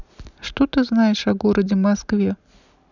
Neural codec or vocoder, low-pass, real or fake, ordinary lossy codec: none; 7.2 kHz; real; none